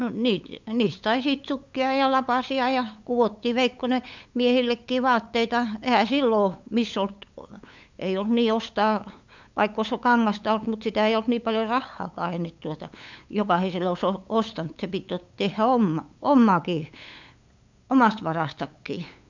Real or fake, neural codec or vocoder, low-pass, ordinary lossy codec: fake; codec, 16 kHz, 8 kbps, FunCodec, trained on LibriTTS, 25 frames a second; 7.2 kHz; MP3, 64 kbps